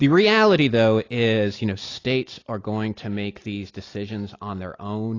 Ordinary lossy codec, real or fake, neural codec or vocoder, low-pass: AAC, 32 kbps; real; none; 7.2 kHz